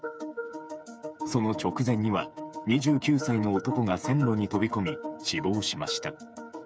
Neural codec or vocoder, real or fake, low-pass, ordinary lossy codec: codec, 16 kHz, 8 kbps, FreqCodec, smaller model; fake; none; none